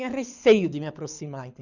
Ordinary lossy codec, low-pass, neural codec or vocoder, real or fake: none; 7.2 kHz; codec, 24 kHz, 6 kbps, HILCodec; fake